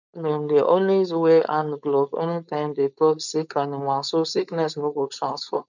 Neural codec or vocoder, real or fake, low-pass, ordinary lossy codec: codec, 16 kHz, 4.8 kbps, FACodec; fake; 7.2 kHz; none